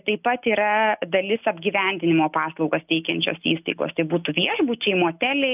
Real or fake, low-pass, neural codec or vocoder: real; 3.6 kHz; none